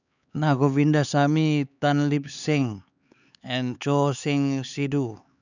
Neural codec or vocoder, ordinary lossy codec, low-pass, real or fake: codec, 16 kHz, 4 kbps, X-Codec, HuBERT features, trained on LibriSpeech; none; 7.2 kHz; fake